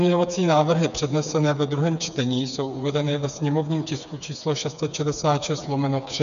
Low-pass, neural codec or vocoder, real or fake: 7.2 kHz; codec, 16 kHz, 4 kbps, FreqCodec, smaller model; fake